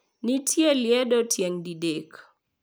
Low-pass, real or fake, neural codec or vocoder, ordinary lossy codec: none; real; none; none